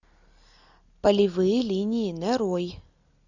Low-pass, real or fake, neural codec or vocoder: 7.2 kHz; real; none